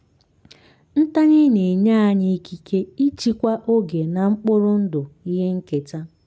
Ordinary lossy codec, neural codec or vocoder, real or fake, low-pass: none; none; real; none